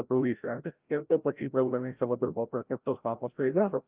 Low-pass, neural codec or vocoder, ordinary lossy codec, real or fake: 3.6 kHz; codec, 16 kHz, 0.5 kbps, FreqCodec, larger model; Opus, 32 kbps; fake